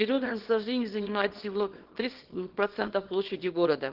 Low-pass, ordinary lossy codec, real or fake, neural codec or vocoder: 5.4 kHz; Opus, 16 kbps; fake; codec, 24 kHz, 0.9 kbps, WavTokenizer, small release